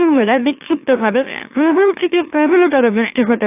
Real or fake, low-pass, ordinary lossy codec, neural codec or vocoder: fake; 3.6 kHz; AAC, 24 kbps; autoencoder, 44.1 kHz, a latent of 192 numbers a frame, MeloTTS